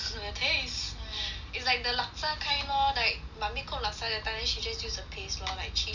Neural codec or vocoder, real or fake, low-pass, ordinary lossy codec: none; real; 7.2 kHz; none